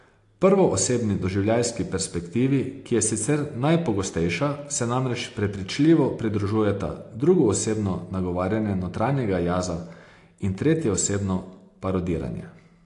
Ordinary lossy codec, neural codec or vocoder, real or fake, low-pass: AAC, 48 kbps; none; real; 10.8 kHz